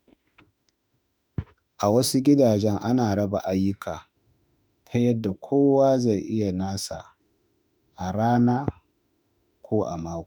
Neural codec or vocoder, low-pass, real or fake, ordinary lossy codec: autoencoder, 48 kHz, 32 numbers a frame, DAC-VAE, trained on Japanese speech; none; fake; none